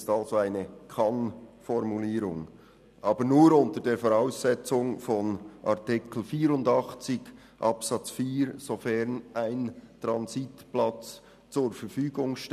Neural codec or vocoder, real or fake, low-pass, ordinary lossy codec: none; real; 14.4 kHz; none